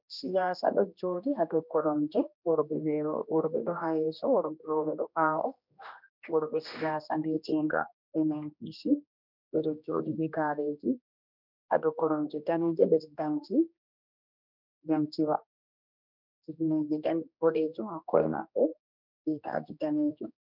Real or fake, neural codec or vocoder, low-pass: fake; codec, 16 kHz, 1 kbps, X-Codec, HuBERT features, trained on general audio; 5.4 kHz